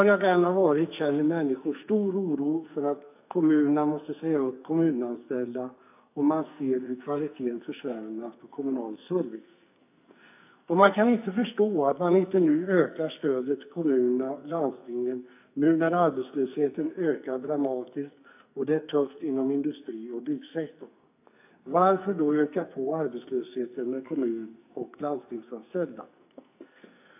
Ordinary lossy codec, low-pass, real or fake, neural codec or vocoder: AAC, 32 kbps; 3.6 kHz; fake; codec, 44.1 kHz, 2.6 kbps, SNAC